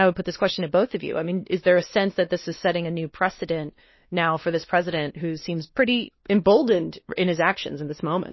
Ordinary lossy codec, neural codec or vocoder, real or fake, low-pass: MP3, 24 kbps; codec, 16 kHz, 2 kbps, X-Codec, WavLM features, trained on Multilingual LibriSpeech; fake; 7.2 kHz